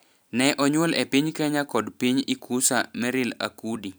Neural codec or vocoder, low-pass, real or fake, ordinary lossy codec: vocoder, 44.1 kHz, 128 mel bands every 256 samples, BigVGAN v2; none; fake; none